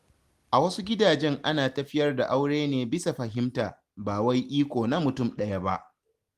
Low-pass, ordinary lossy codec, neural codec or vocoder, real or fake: 14.4 kHz; Opus, 24 kbps; none; real